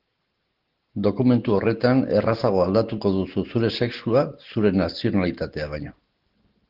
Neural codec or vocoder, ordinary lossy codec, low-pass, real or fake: none; Opus, 16 kbps; 5.4 kHz; real